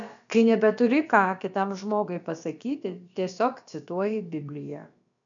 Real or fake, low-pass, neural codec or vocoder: fake; 7.2 kHz; codec, 16 kHz, about 1 kbps, DyCAST, with the encoder's durations